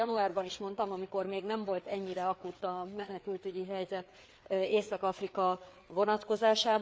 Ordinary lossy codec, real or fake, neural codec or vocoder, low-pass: none; fake; codec, 16 kHz, 4 kbps, FreqCodec, larger model; none